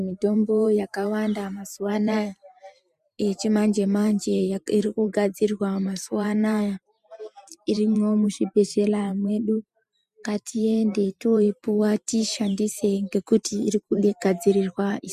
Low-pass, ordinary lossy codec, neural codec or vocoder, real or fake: 14.4 kHz; MP3, 96 kbps; vocoder, 44.1 kHz, 128 mel bands every 512 samples, BigVGAN v2; fake